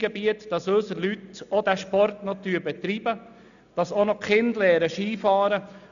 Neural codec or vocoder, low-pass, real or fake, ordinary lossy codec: none; 7.2 kHz; real; none